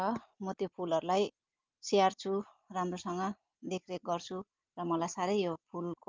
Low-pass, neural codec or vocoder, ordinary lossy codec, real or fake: 7.2 kHz; none; Opus, 32 kbps; real